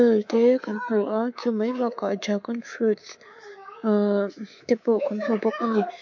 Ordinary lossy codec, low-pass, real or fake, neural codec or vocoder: MP3, 48 kbps; 7.2 kHz; fake; codec, 24 kHz, 3.1 kbps, DualCodec